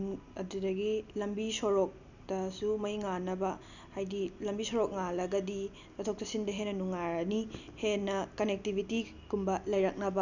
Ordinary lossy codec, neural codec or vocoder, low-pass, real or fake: none; none; 7.2 kHz; real